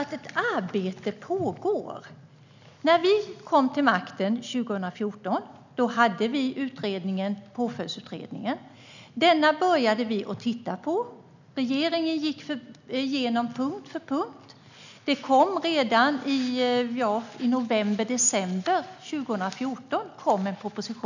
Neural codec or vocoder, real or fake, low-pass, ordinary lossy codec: none; real; 7.2 kHz; none